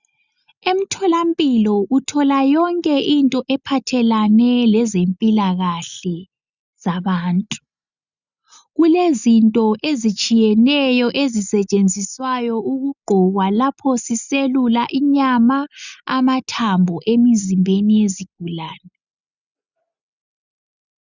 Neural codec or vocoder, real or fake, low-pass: none; real; 7.2 kHz